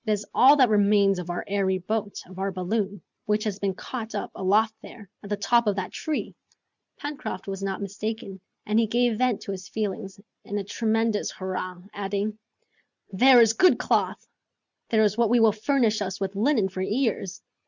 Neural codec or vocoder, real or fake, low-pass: none; real; 7.2 kHz